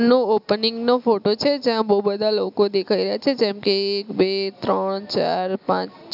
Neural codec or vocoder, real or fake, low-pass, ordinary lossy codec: none; real; 5.4 kHz; none